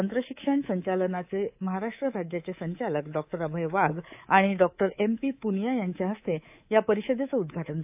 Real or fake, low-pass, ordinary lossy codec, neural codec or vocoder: fake; 3.6 kHz; AAC, 32 kbps; codec, 24 kHz, 3.1 kbps, DualCodec